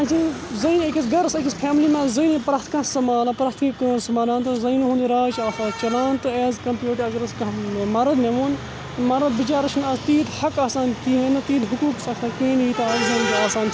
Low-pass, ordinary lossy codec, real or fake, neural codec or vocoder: none; none; real; none